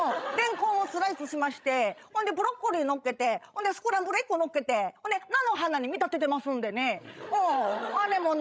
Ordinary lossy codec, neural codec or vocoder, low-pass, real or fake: none; codec, 16 kHz, 16 kbps, FreqCodec, larger model; none; fake